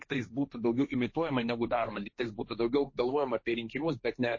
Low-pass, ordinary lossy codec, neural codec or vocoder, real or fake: 7.2 kHz; MP3, 32 kbps; codec, 16 kHz, 1.1 kbps, Voila-Tokenizer; fake